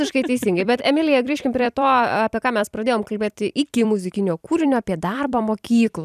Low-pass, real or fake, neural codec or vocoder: 14.4 kHz; fake; vocoder, 44.1 kHz, 128 mel bands every 256 samples, BigVGAN v2